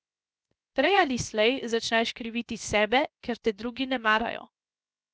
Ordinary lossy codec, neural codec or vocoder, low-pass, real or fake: none; codec, 16 kHz, 0.7 kbps, FocalCodec; none; fake